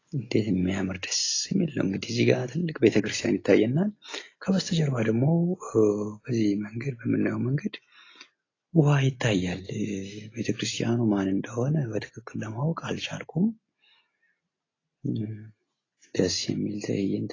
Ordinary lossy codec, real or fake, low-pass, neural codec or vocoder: AAC, 32 kbps; real; 7.2 kHz; none